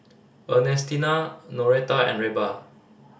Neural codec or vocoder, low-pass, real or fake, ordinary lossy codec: none; none; real; none